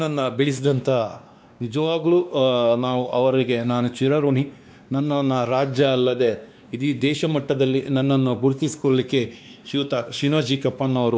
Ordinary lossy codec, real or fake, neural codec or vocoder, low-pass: none; fake; codec, 16 kHz, 2 kbps, X-Codec, WavLM features, trained on Multilingual LibriSpeech; none